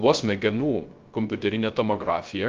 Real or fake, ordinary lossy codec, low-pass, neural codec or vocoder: fake; Opus, 32 kbps; 7.2 kHz; codec, 16 kHz, 0.3 kbps, FocalCodec